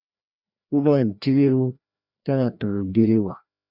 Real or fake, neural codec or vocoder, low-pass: fake; codec, 16 kHz, 1 kbps, FreqCodec, larger model; 5.4 kHz